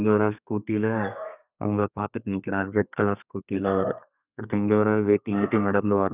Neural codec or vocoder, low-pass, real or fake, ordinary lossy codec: codec, 32 kHz, 1.9 kbps, SNAC; 3.6 kHz; fake; none